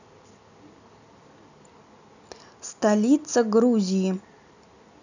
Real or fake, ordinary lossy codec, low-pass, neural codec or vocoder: real; none; 7.2 kHz; none